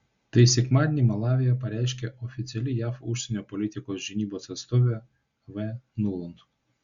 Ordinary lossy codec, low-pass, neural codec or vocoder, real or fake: Opus, 64 kbps; 7.2 kHz; none; real